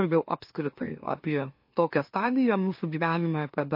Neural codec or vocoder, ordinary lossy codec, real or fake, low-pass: autoencoder, 44.1 kHz, a latent of 192 numbers a frame, MeloTTS; MP3, 32 kbps; fake; 5.4 kHz